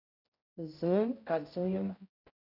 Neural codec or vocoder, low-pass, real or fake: codec, 16 kHz, 0.5 kbps, X-Codec, HuBERT features, trained on general audio; 5.4 kHz; fake